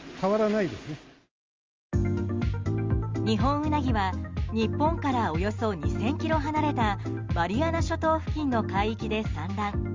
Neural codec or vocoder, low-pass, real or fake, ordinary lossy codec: none; 7.2 kHz; real; Opus, 32 kbps